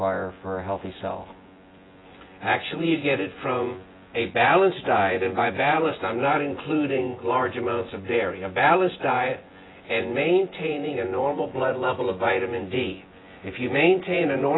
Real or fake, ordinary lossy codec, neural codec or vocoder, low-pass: fake; AAC, 16 kbps; vocoder, 24 kHz, 100 mel bands, Vocos; 7.2 kHz